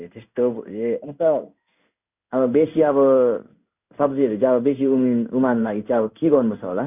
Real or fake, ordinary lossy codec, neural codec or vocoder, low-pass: fake; none; codec, 16 kHz in and 24 kHz out, 1 kbps, XY-Tokenizer; 3.6 kHz